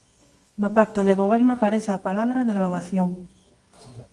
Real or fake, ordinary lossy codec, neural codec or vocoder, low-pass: fake; Opus, 32 kbps; codec, 24 kHz, 0.9 kbps, WavTokenizer, medium music audio release; 10.8 kHz